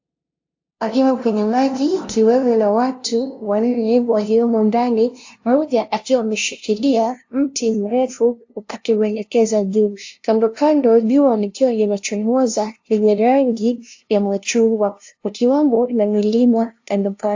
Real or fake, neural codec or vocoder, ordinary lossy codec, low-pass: fake; codec, 16 kHz, 0.5 kbps, FunCodec, trained on LibriTTS, 25 frames a second; AAC, 48 kbps; 7.2 kHz